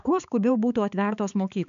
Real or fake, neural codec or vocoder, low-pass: fake; codec, 16 kHz, 4 kbps, X-Codec, HuBERT features, trained on balanced general audio; 7.2 kHz